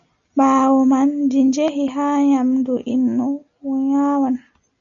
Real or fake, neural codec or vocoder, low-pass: real; none; 7.2 kHz